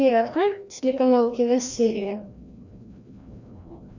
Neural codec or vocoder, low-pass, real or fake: codec, 16 kHz, 1 kbps, FreqCodec, larger model; 7.2 kHz; fake